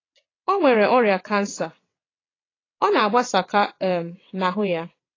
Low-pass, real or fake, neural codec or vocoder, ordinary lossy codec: 7.2 kHz; fake; vocoder, 22.05 kHz, 80 mel bands, WaveNeXt; AAC, 32 kbps